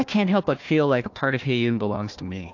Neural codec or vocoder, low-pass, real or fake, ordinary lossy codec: codec, 16 kHz, 1 kbps, FunCodec, trained on Chinese and English, 50 frames a second; 7.2 kHz; fake; AAC, 48 kbps